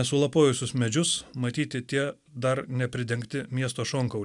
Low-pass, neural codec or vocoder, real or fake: 10.8 kHz; none; real